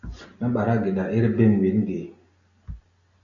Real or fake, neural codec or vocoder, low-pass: real; none; 7.2 kHz